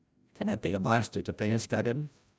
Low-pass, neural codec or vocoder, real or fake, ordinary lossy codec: none; codec, 16 kHz, 0.5 kbps, FreqCodec, larger model; fake; none